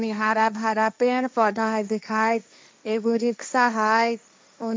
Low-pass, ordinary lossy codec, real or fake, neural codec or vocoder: none; none; fake; codec, 16 kHz, 1.1 kbps, Voila-Tokenizer